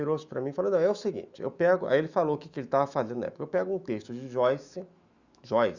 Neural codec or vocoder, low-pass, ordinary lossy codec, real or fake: autoencoder, 48 kHz, 128 numbers a frame, DAC-VAE, trained on Japanese speech; 7.2 kHz; Opus, 64 kbps; fake